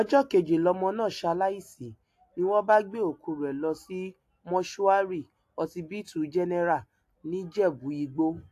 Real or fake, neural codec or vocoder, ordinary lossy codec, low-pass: real; none; AAC, 64 kbps; 14.4 kHz